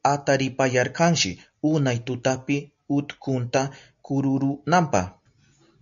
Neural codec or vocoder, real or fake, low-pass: none; real; 7.2 kHz